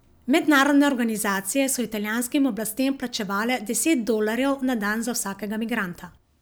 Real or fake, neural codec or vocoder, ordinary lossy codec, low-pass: real; none; none; none